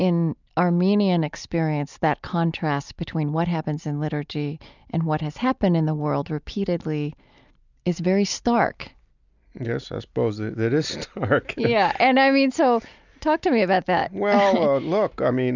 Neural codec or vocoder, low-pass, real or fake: none; 7.2 kHz; real